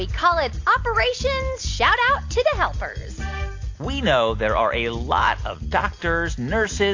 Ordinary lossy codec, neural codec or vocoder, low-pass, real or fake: AAC, 48 kbps; none; 7.2 kHz; real